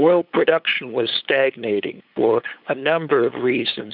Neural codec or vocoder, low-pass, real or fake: codec, 16 kHz, 8 kbps, FunCodec, trained on LibriTTS, 25 frames a second; 5.4 kHz; fake